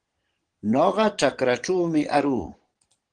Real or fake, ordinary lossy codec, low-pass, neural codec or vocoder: fake; Opus, 16 kbps; 9.9 kHz; vocoder, 22.05 kHz, 80 mel bands, Vocos